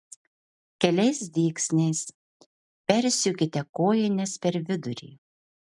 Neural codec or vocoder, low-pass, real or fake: none; 10.8 kHz; real